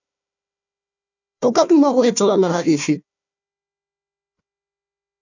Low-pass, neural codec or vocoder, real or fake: 7.2 kHz; codec, 16 kHz, 1 kbps, FunCodec, trained on Chinese and English, 50 frames a second; fake